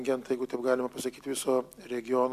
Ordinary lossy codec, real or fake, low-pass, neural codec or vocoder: MP3, 96 kbps; real; 14.4 kHz; none